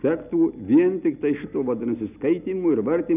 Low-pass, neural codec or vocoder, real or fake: 3.6 kHz; none; real